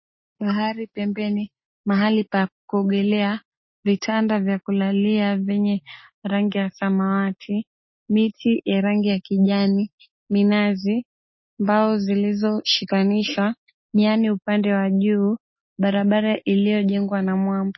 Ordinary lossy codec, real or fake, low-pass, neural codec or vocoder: MP3, 24 kbps; real; 7.2 kHz; none